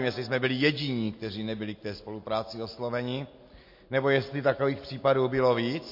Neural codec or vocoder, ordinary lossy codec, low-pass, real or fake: none; MP3, 32 kbps; 5.4 kHz; real